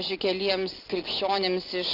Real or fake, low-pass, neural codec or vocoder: real; 5.4 kHz; none